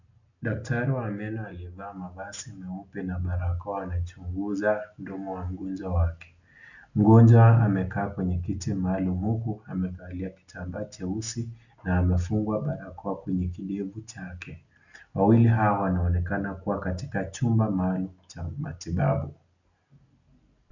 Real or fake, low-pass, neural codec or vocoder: real; 7.2 kHz; none